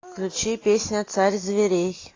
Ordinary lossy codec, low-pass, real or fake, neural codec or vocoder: AAC, 32 kbps; 7.2 kHz; real; none